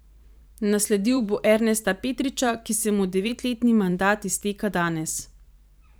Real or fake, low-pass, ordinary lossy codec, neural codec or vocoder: fake; none; none; vocoder, 44.1 kHz, 128 mel bands every 512 samples, BigVGAN v2